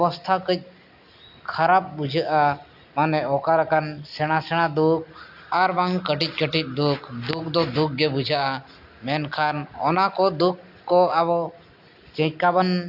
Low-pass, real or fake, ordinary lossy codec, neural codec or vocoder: 5.4 kHz; real; AAC, 48 kbps; none